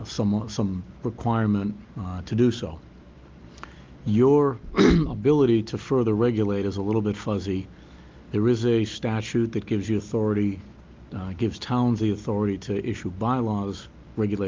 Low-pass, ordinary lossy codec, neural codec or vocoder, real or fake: 7.2 kHz; Opus, 32 kbps; none; real